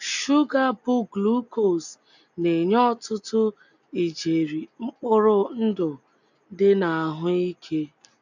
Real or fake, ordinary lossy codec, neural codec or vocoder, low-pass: real; none; none; 7.2 kHz